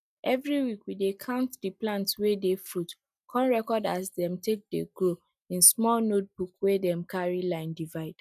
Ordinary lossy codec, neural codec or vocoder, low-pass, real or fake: none; none; 14.4 kHz; real